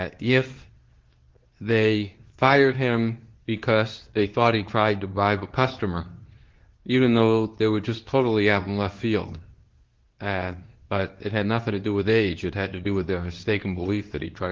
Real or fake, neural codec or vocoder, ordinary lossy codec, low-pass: fake; codec, 24 kHz, 0.9 kbps, WavTokenizer, medium speech release version 2; Opus, 16 kbps; 7.2 kHz